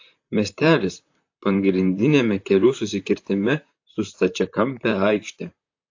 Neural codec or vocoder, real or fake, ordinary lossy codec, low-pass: vocoder, 44.1 kHz, 128 mel bands, Pupu-Vocoder; fake; AAC, 48 kbps; 7.2 kHz